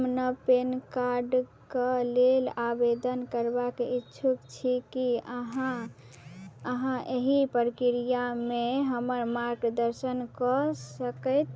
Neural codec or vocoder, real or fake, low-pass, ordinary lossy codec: none; real; none; none